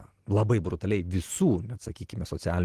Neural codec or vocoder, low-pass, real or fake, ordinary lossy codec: none; 14.4 kHz; real; Opus, 16 kbps